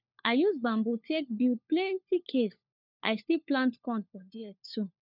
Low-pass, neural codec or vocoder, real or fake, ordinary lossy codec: 5.4 kHz; codec, 16 kHz, 4 kbps, FunCodec, trained on LibriTTS, 50 frames a second; fake; none